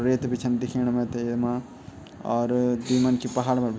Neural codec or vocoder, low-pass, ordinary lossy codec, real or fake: none; none; none; real